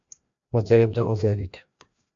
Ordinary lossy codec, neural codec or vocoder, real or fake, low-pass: AAC, 64 kbps; codec, 16 kHz, 1 kbps, FreqCodec, larger model; fake; 7.2 kHz